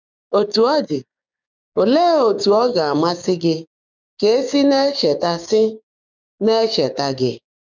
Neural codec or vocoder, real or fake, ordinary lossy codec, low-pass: codec, 16 kHz, 6 kbps, DAC; fake; none; 7.2 kHz